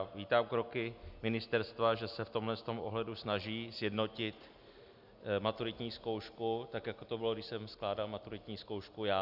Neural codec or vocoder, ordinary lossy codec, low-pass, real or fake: none; Opus, 64 kbps; 5.4 kHz; real